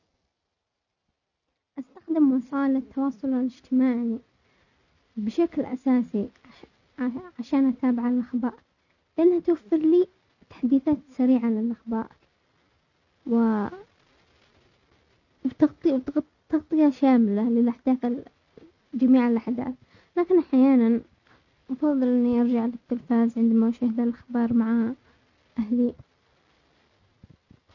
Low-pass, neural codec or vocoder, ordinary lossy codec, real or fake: 7.2 kHz; none; none; real